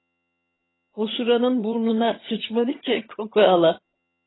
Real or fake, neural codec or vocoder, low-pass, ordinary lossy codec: fake; vocoder, 22.05 kHz, 80 mel bands, HiFi-GAN; 7.2 kHz; AAC, 16 kbps